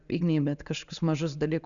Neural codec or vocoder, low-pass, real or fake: none; 7.2 kHz; real